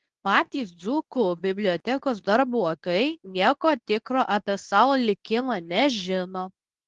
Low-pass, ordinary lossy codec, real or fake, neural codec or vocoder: 10.8 kHz; Opus, 24 kbps; fake; codec, 24 kHz, 0.9 kbps, WavTokenizer, medium speech release version 2